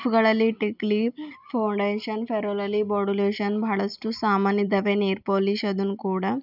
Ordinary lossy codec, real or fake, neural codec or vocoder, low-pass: none; real; none; 5.4 kHz